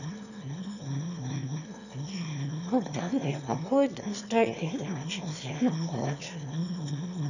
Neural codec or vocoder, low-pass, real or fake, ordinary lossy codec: autoencoder, 22.05 kHz, a latent of 192 numbers a frame, VITS, trained on one speaker; 7.2 kHz; fake; none